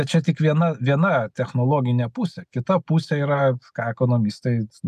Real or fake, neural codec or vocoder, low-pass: real; none; 14.4 kHz